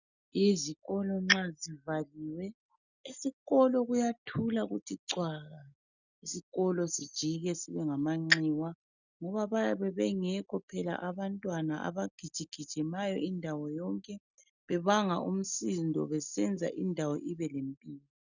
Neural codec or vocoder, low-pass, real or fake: none; 7.2 kHz; real